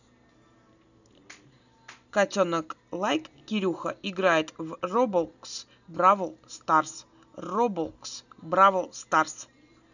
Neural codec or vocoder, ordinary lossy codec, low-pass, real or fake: none; none; 7.2 kHz; real